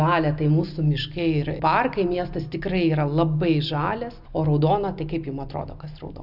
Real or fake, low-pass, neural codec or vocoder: real; 5.4 kHz; none